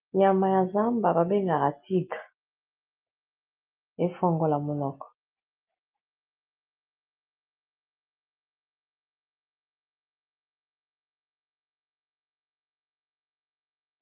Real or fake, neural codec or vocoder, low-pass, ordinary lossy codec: real; none; 3.6 kHz; Opus, 32 kbps